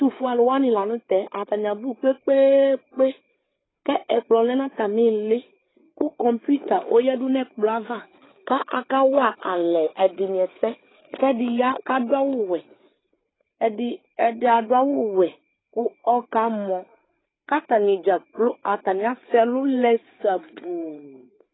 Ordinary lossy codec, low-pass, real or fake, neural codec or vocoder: AAC, 16 kbps; 7.2 kHz; fake; codec, 16 kHz, 4 kbps, FreqCodec, larger model